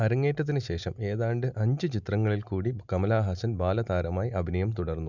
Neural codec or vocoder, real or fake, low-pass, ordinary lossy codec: none; real; 7.2 kHz; none